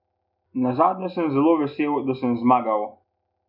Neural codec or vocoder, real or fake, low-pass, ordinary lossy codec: none; real; 5.4 kHz; none